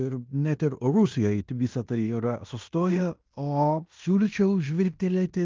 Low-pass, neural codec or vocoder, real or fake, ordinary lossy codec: 7.2 kHz; codec, 16 kHz in and 24 kHz out, 0.9 kbps, LongCat-Audio-Codec, fine tuned four codebook decoder; fake; Opus, 32 kbps